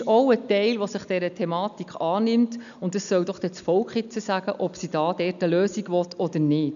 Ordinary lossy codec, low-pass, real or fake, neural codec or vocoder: none; 7.2 kHz; real; none